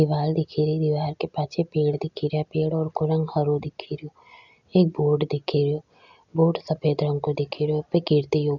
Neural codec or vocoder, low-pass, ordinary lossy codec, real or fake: none; 7.2 kHz; none; real